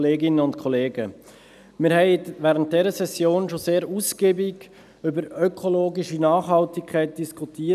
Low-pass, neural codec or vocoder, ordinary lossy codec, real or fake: 14.4 kHz; none; none; real